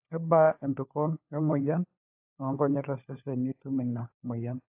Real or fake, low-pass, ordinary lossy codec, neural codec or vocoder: fake; 3.6 kHz; AAC, 32 kbps; codec, 16 kHz, 4 kbps, FunCodec, trained on LibriTTS, 50 frames a second